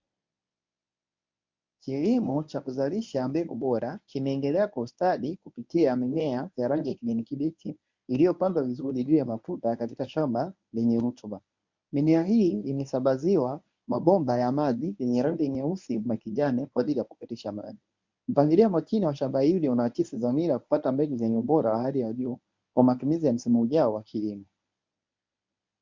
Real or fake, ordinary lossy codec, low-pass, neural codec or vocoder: fake; MP3, 64 kbps; 7.2 kHz; codec, 24 kHz, 0.9 kbps, WavTokenizer, medium speech release version 1